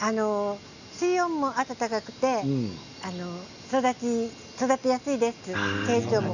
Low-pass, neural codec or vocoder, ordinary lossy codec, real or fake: 7.2 kHz; none; none; real